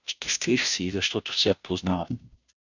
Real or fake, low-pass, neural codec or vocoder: fake; 7.2 kHz; codec, 16 kHz, 0.5 kbps, FunCodec, trained on Chinese and English, 25 frames a second